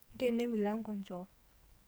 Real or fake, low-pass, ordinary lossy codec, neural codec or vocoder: fake; none; none; codec, 44.1 kHz, 2.6 kbps, SNAC